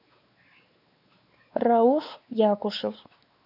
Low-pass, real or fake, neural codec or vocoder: 5.4 kHz; fake; codec, 16 kHz, 4 kbps, X-Codec, WavLM features, trained on Multilingual LibriSpeech